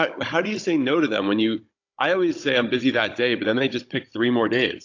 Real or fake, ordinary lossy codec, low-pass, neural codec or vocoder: fake; AAC, 48 kbps; 7.2 kHz; codec, 16 kHz, 16 kbps, FunCodec, trained on Chinese and English, 50 frames a second